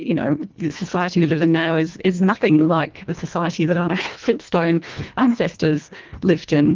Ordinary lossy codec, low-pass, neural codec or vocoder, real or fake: Opus, 32 kbps; 7.2 kHz; codec, 24 kHz, 1.5 kbps, HILCodec; fake